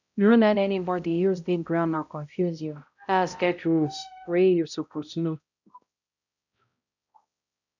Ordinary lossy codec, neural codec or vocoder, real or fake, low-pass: none; codec, 16 kHz, 0.5 kbps, X-Codec, HuBERT features, trained on balanced general audio; fake; 7.2 kHz